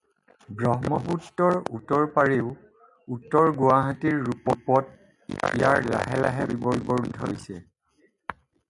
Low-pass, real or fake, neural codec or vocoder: 10.8 kHz; real; none